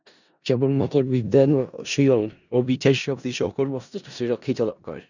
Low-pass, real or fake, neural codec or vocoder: 7.2 kHz; fake; codec, 16 kHz in and 24 kHz out, 0.4 kbps, LongCat-Audio-Codec, four codebook decoder